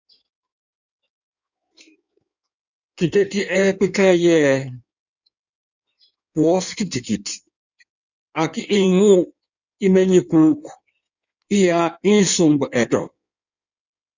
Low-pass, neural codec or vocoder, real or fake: 7.2 kHz; codec, 16 kHz in and 24 kHz out, 1.1 kbps, FireRedTTS-2 codec; fake